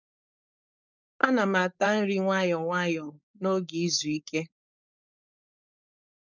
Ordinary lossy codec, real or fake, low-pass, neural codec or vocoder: none; fake; 7.2 kHz; codec, 16 kHz, 4.8 kbps, FACodec